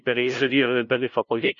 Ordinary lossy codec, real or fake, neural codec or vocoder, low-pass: MP3, 48 kbps; fake; codec, 16 kHz, 0.5 kbps, FunCodec, trained on LibriTTS, 25 frames a second; 7.2 kHz